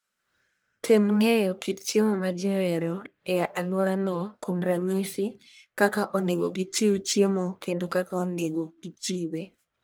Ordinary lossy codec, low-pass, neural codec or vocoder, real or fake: none; none; codec, 44.1 kHz, 1.7 kbps, Pupu-Codec; fake